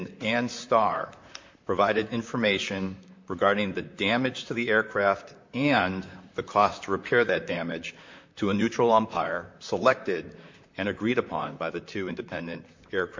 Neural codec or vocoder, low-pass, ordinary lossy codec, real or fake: vocoder, 44.1 kHz, 128 mel bands, Pupu-Vocoder; 7.2 kHz; MP3, 48 kbps; fake